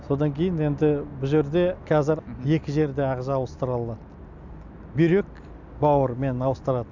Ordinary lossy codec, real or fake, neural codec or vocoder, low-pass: none; real; none; 7.2 kHz